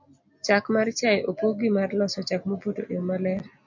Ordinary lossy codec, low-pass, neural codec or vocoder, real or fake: MP3, 64 kbps; 7.2 kHz; none; real